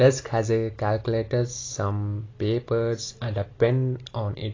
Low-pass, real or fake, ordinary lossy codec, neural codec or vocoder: 7.2 kHz; real; AAC, 32 kbps; none